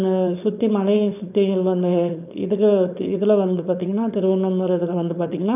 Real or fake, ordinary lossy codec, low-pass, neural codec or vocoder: fake; none; 3.6 kHz; codec, 16 kHz, 4.8 kbps, FACodec